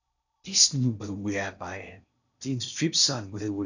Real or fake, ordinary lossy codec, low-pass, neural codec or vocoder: fake; none; 7.2 kHz; codec, 16 kHz in and 24 kHz out, 0.6 kbps, FocalCodec, streaming, 4096 codes